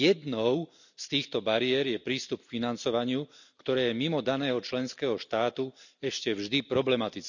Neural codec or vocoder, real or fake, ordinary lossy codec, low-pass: none; real; none; 7.2 kHz